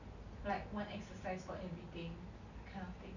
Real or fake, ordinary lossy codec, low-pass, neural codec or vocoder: real; none; 7.2 kHz; none